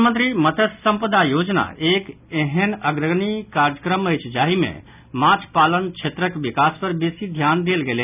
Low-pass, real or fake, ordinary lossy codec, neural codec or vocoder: 3.6 kHz; real; none; none